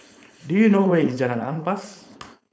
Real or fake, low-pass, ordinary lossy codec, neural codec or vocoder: fake; none; none; codec, 16 kHz, 4.8 kbps, FACodec